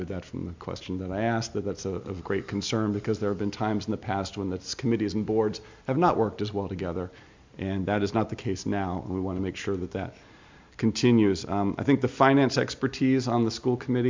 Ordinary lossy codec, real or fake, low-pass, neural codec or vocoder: MP3, 64 kbps; real; 7.2 kHz; none